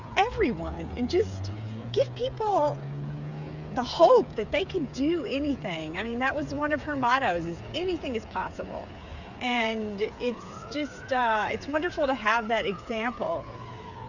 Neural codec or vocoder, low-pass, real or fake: codec, 16 kHz, 8 kbps, FreqCodec, smaller model; 7.2 kHz; fake